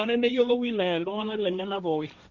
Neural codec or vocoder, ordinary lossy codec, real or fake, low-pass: codec, 16 kHz, 1.1 kbps, Voila-Tokenizer; none; fake; 7.2 kHz